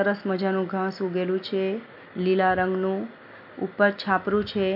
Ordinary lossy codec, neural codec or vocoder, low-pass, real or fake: MP3, 48 kbps; none; 5.4 kHz; real